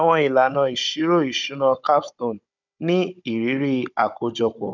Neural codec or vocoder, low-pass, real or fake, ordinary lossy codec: codec, 16 kHz, 16 kbps, FunCodec, trained on Chinese and English, 50 frames a second; 7.2 kHz; fake; none